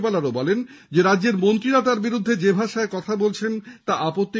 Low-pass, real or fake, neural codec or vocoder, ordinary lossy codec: none; real; none; none